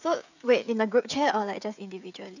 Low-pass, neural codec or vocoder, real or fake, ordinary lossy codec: 7.2 kHz; codec, 16 kHz, 16 kbps, FreqCodec, smaller model; fake; none